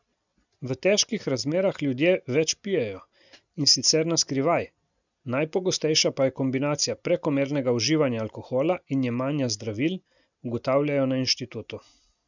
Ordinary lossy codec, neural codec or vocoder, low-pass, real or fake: none; none; 7.2 kHz; real